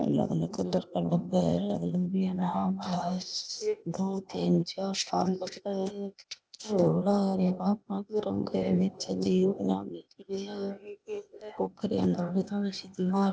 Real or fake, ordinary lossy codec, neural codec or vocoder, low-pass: fake; none; codec, 16 kHz, 0.8 kbps, ZipCodec; none